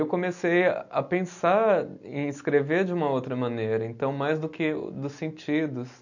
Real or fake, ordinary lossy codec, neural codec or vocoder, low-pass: real; none; none; 7.2 kHz